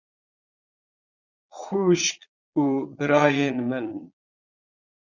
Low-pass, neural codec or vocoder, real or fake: 7.2 kHz; vocoder, 22.05 kHz, 80 mel bands, Vocos; fake